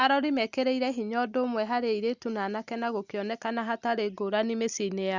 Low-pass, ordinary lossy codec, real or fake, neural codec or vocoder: 7.2 kHz; Opus, 64 kbps; real; none